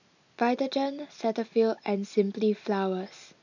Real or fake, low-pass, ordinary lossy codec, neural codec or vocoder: real; 7.2 kHz; none; none